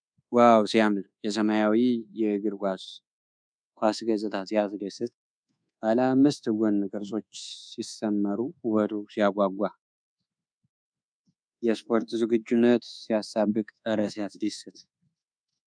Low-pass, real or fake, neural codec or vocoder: 9.9 kHz; fake; codec, 24 kHz, 1.2 kbps, DualCodec